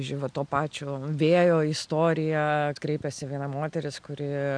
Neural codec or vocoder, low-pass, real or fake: none; 9.9 kHz; real